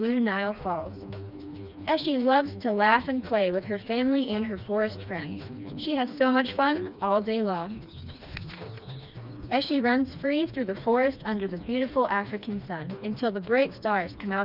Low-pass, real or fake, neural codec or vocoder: 5.4 kHz; fake; codec, 16 kHz, 2 kbps, FreqCodec, smaller model